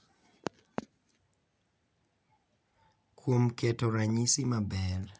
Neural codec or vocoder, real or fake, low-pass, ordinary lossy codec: none; real; none; none